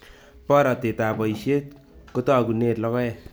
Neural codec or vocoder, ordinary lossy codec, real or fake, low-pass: none; none; real; none